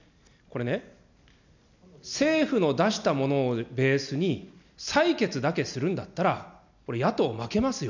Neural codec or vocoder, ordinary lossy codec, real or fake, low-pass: none; none; real; 7.2 kHz